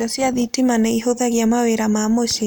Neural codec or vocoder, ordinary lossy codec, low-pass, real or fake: none; none; none; real